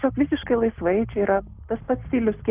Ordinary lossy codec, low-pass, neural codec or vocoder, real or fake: Opus, 32 kbps; 3.6 kHz; none; real